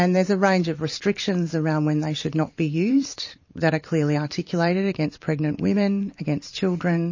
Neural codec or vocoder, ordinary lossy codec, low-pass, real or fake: vocoder, 44.1 kHz, 128 mel bands every 512 samples, BigVGAN v2; MP3, 32 kbps; 7.2 kHz; fake